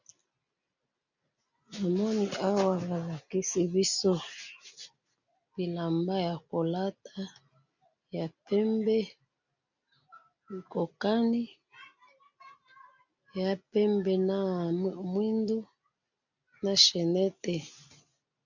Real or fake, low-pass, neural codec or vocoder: real; 7.2 kHz; none